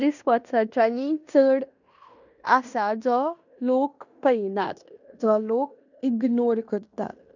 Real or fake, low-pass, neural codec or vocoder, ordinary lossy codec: fake; 7.2 kHz; codec, 16 kHz in and 24 kHz out, 0.9 kbps, LongCat-Audio-Codec, fine tuned four codebook decoder; none